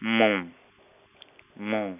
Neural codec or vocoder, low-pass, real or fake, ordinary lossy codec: none; 3.6 kHz; real; none